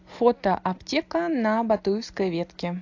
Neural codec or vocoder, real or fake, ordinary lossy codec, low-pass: none; real; AAC, 48 kbps; 7.2 kHz